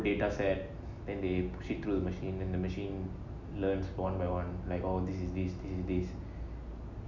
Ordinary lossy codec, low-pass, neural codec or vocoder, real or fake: none; 7.2 kHz; none; real